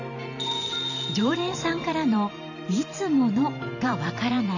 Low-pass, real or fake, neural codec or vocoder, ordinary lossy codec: 7.2 kHz; fake; vocoder, 44.1 kHz, 128 mel bands every 512 samples, BigVGAN v2; none